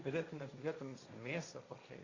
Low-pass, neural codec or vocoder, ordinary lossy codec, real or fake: 7.2 kHz; codec, 16 kHz, 1.1 kbps, Voila-Tokenizer; Opus, 64 kbps; fake